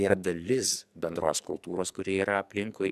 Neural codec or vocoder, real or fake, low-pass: codec, 44.1 kHz, 2.6 kbps, SNAC; fake; 14.4 kHz